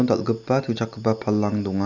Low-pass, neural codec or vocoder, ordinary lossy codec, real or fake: 7.2 kHz; none; none; real